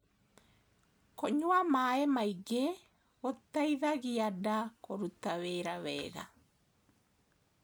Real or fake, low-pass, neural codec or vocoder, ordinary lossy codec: real; none; none; none